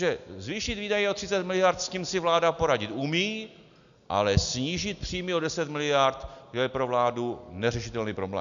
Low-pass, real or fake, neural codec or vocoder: 7.2 kHz; real; none